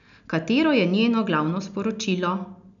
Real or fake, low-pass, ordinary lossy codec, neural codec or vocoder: real; 7.2 kHz; none; none